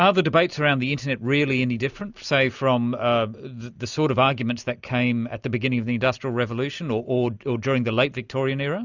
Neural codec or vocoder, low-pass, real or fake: none; 7.2 kHz; real